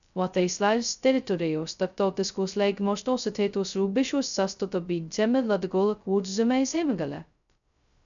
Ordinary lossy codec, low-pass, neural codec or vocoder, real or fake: MP3, 96 kbps; 7.2 kHz; codec, 16 kHz, 0.2 kbps, FocalCodec; fake